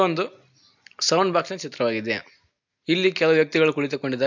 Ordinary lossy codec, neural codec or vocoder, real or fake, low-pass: MP3, 64 kbps; none; real; 7.2 kHz